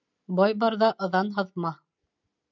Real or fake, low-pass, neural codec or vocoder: real; 7.2 kHz; none